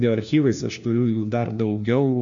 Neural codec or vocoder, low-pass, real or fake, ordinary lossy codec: codec, 16 kHz, 1 kbps, FunCodec, trained on LibriTTS, 50 frames a second; 7.2 kHz; fake; MP3, 64 kbps